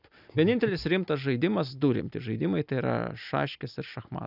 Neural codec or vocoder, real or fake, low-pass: none; real; 5.4 kHz